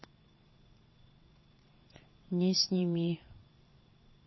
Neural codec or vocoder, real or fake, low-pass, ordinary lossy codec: vocoder, 22.05 kHz, 80 mel bands, WaveNeXt; fake; 7.2 kHz; MP3, 24 kbps